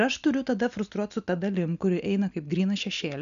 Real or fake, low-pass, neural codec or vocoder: real; 7.2 kHz; none